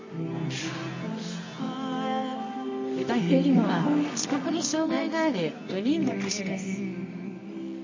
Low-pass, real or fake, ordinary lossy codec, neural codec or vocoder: 7.2 kHz; fake; MP3, 32 kbps; codec, 24 kHz, 0.9 kbps, WavTokenizer, medium music audio release